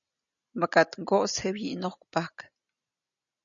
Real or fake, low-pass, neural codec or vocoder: real; 7.2 kHz; none